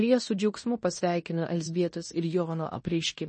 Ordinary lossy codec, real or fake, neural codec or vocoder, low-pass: MP3, 32 kbps; fake; codec, 16 kHz in and 24 kHz out, 0.9 kbps, LongCat-Audio-Codec, fine tuned four codebook decoder; 10.8 kHz